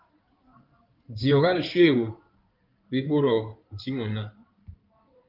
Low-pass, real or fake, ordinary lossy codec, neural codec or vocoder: 5.4 kHz; fake; Opus, 32 kbps; codec, 16 kHz in and 24 kHz out, 2.2 kbps, FireRedTTS-2 codec